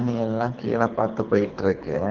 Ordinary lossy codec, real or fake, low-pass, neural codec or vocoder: Opus, 32 kbps; fake; 7.2 kHz; codec, 24 kHz, 3 kbps, HILCodec